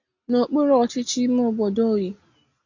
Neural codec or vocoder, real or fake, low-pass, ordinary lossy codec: none; real; 7.2 kHz; AAC, 48 kbps